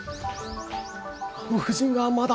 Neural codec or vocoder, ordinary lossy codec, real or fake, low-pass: none; none; real; none